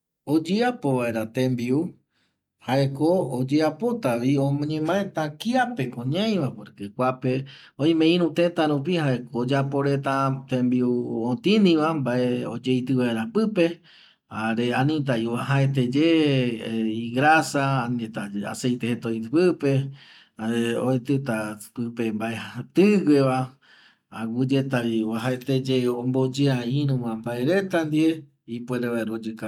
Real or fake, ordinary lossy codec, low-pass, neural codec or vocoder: real; none; 19.8 kHz; none